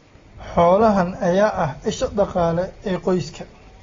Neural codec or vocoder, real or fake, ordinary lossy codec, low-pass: none; real; AAC, 24 kbps; 7.2 kHz